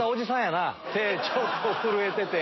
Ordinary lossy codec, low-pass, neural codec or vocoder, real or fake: MP3, 24 kbps; 7.2 kHz; none; real